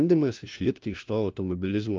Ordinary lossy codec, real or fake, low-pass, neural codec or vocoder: Opus, 24 kbps; fake; 7.2 kHz; codec, 16 kHz, 1 kbps, FunCodec, trained on LibriTTS, 50 frames a second